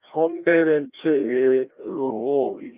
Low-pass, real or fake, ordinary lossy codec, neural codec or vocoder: 3.6 kHz; fake; Opus, 24 kbps; codec, 16 kHz, 1 kbps, FreqCodec, larger model